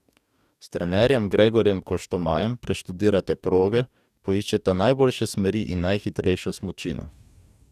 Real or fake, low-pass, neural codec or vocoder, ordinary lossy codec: fake; 14.4 kHz; codec, 44.1 kHz, 2.6 kbps, DAC; none